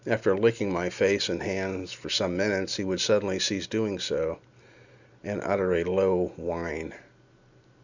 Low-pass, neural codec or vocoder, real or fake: 7.2 kHz; none; real